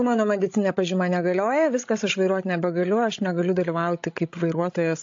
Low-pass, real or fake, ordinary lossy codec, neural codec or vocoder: 7.2 kHz; fake; AAC, 48 kbps; codec, 16 kHz, 16 kbps, FreqCodec, larger model